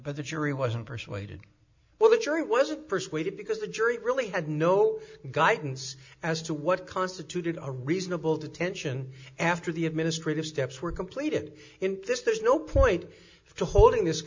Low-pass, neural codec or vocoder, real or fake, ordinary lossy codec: 7.2 kHz; none; real; MP3, 64 kbps